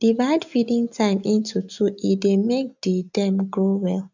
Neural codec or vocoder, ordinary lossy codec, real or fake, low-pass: none; none; real; 7.2 kHz